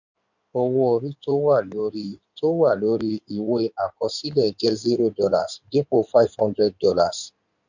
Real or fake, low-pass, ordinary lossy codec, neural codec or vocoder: fake; 7.2 kHz; none; codec, 16 kHz in and 24 kHz out, 2.2 kbps, FireRedTTS-2 codec